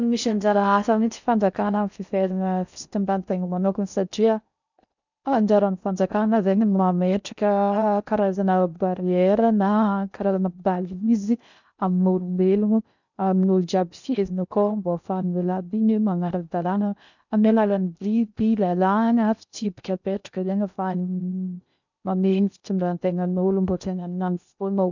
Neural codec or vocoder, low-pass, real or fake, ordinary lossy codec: codec, 16 kHz in and 24 kHz out, 0.6 kbps, FocalCodec, streaming, 4096 codes; 7.2 kHz; fake; none